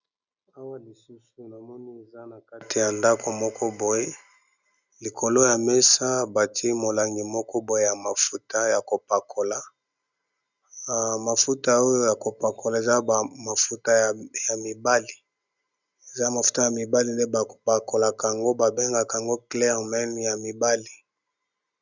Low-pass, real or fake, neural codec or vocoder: 7.2 kHz; real; none